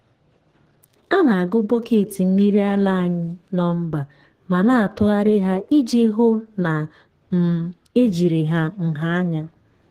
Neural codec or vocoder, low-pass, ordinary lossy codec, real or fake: codec, 32 kHz, 1.9 kbps, SNAC; 14.4 kHz; Opus, 16 kbps; fake